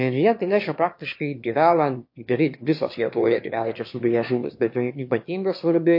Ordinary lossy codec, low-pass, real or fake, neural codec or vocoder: MP3, 32 kbps; 5.4 kHz; fake; autoencoder, 22.05 kHz, a latent of 192 numbers a frame, VITS, trained on one speaker